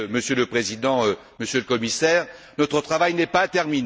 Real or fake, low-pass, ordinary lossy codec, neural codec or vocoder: real; none; none; none